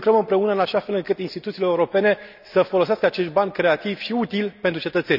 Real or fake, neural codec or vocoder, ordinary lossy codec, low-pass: real; none; none; 5.4 kHz